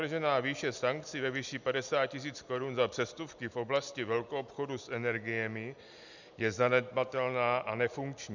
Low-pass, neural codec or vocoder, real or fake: 7.2 kHz; none; real